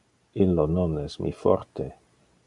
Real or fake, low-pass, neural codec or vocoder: real; 10.8 kHz; none